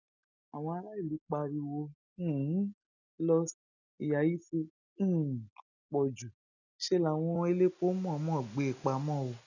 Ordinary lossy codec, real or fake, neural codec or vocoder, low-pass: none; real; none; 7.2 kHz